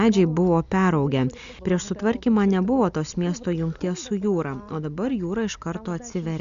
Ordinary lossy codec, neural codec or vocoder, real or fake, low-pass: AAC, 96 kbps; none; real; 7.2 kHz